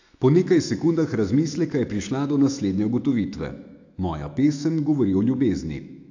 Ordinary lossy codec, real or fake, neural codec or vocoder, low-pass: AAC, 48 kbps; fake; autoencoder, 48 kHz, 128 numbers a frame, DAC-VAE, trained on Japanese speech; 7.2 kHz